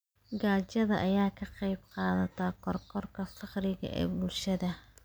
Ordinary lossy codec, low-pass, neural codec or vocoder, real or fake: none; none; none; real